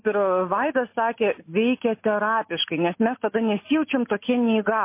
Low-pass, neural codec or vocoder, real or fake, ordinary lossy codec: 3.6 kHz; none; real; MP3, 24 kbps